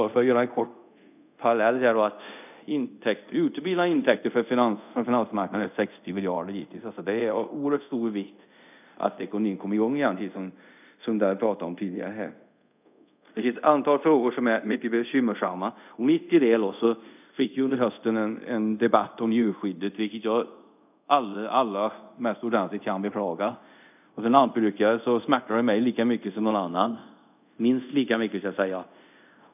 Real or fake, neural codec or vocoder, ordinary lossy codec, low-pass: fake; codec, 24 kHz, 0.5 kbps, DualCodec; none; 3.6 kHz